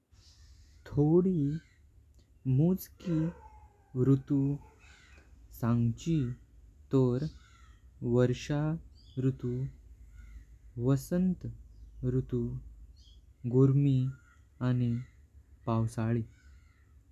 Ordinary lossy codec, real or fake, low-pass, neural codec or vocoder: none; real; 14.4 kHz; none